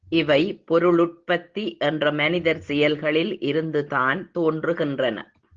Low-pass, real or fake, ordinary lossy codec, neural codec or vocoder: 7.2 kHz; real; Opus, 16 kbps; none